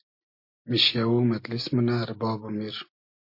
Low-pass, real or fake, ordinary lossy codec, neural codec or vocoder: 5.4 kHz; real; MP3, 32 kbps; none